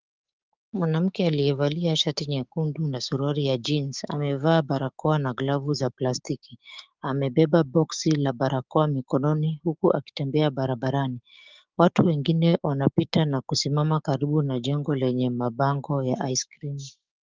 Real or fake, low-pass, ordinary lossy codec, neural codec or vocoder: fake; 7.2 kHz; Opus, 32 kbps; codec, 16 kHz, 6 kbps, DAC